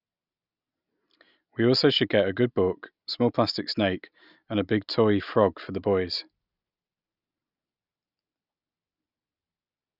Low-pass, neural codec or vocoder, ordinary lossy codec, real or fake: 5.4 kHz; none; none; real